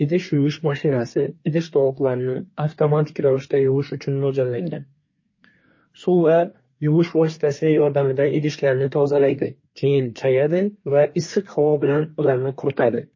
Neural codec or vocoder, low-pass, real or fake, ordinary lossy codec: codec, 24 kHz, 1 kbps, SNAC; 7.2 kHz; fake; MP3, 32 kbps